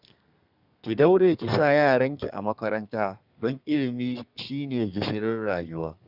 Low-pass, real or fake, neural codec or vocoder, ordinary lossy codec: 5.4 kHz; fake; codec, 32 kHz, 1.9 kbps, SNAC; Opus, 64 kbps